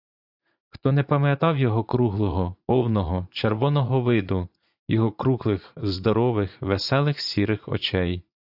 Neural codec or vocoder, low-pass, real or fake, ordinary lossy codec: vocoder, 44.1 kHz, 80 mel bands, Vocos; 5.4 kHz; fake; AAC, 48 kbps